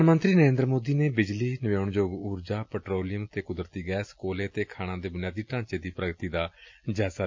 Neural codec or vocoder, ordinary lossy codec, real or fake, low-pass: none; MP3, 48 kbps; real; 7.2 kHz